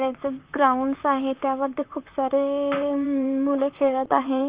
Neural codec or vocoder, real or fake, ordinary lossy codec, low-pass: vocoder, 44.1 kHz, 128 mel bands, Pupu-Vocoder; fake; Opus, 24 kbps; 3.6 kHz